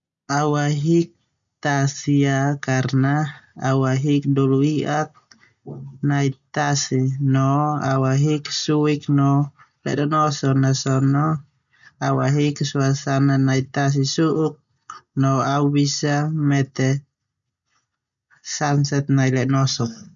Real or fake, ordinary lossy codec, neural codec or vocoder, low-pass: real; none; none; 7.2 kHz